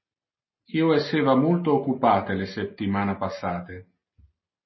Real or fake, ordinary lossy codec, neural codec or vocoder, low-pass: real; MP3, 24 kbps; none; 7.2 kHz